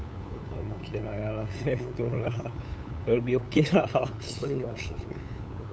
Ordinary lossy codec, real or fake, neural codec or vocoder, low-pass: none; fake; codec, 16 kHz, 8 kbps, FunCodec, trained on LibriTTS, 25 frames a second; none